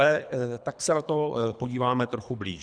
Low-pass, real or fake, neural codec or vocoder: 9.9 kHz; fake; codec, 24 kHz, 3 kbps, HILCodec